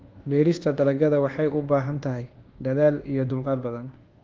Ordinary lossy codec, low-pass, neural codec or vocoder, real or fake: Opus, 16 kbps; 7.2 kHz; codec, 24 kHz, 1.2 kbps, DualCodec; fake